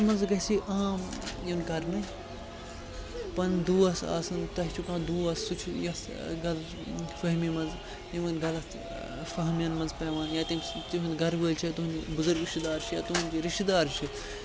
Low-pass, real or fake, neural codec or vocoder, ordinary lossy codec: none; real; none; none